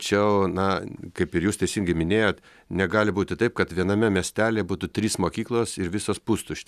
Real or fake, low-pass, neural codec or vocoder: real; 14.4 kHz; none